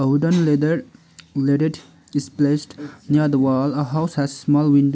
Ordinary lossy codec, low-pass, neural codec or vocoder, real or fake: none; none; none; real